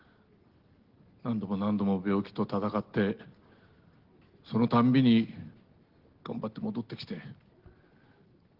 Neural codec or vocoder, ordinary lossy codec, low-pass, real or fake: none; Opus, 16 kbps; 5.4 kHz; real